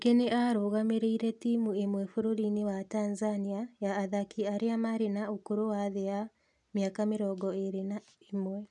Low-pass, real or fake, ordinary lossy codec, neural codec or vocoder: 10.8 kHz; real; none; none